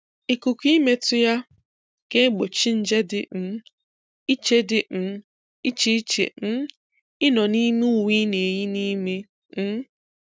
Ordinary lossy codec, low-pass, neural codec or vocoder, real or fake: none; none; none; real